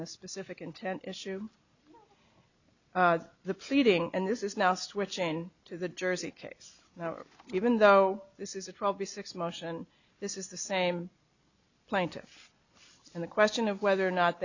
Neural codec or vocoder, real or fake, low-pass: none; real; 7.2 kHz